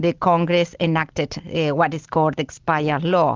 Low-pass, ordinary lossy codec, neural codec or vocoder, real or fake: 7.2 kHz; Opus, 32 kbps; none; real